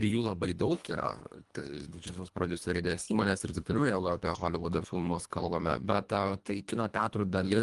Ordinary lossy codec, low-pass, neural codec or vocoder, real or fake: Opus, 32 kbps; 10.8 kHz; codec, 24 kHz, 1.5 kbps, HILCodec; fake